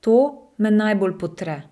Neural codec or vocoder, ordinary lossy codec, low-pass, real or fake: none; none; none; real